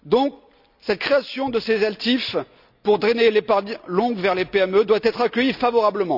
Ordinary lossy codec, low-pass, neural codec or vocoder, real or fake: none; 5.4 kHz; none; real